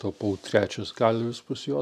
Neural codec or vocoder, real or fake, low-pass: none; real; 14.4 kHz